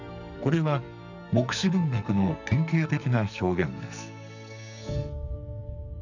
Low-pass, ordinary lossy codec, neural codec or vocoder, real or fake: 7.2 kHz; none; codec, 44.1 kHz, 2.6 kbps, SNAC; fake